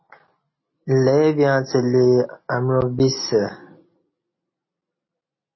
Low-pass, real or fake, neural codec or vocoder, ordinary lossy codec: 7.2 kHz; real; none; MP3, 24 kbps